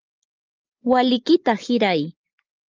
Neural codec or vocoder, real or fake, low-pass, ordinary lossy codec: none; real; 7.2 kHz; Opus, 24 kbps